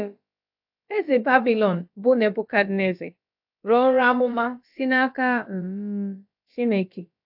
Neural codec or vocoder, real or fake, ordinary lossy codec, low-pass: codec, 16 kHz, about 1 kbps, DyCAST, with the encoder's durations; fake; AAC, 48 kbps; 5.4 kHz